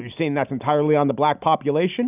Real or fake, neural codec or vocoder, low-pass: real; none; 3.6 kHz